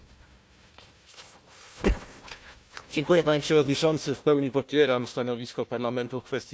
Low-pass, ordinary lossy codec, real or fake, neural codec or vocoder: none; none; fake; codec, 16 kHz, 1 kbps, FunCodec, trained on Chinese and English, 50 frames a second